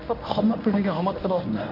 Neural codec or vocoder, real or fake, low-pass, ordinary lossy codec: codec, 24 kHz, 0.9 kbps, WavTokenizer, medium speech release version 1; fake; 5.4 kHz; AAC, 32 kbps